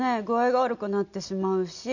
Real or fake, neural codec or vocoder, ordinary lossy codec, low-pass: real; none; none; 7.2 kHz